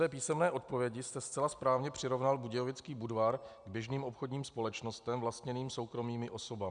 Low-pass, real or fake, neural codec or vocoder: 9.9 kHz; real; none